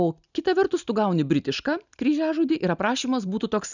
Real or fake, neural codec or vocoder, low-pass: real; none; 7.2 kHz